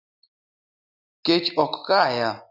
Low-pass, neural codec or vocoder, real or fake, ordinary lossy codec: 5.4 kHz; none; real; Opus, 64 kbps